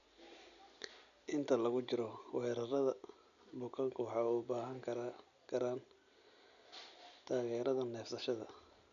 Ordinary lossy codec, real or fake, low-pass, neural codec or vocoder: none; real; 7.2 kHz; none